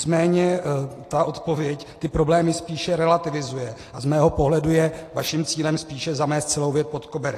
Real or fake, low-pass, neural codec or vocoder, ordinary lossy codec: real; 14.4 kHz; none; AAC, 48 kbps